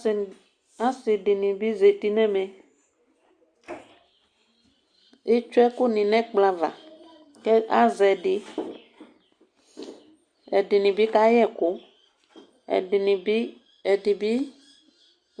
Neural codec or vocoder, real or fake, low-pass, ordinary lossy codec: none; real; 9.9 kHz; Opus, 64 kbps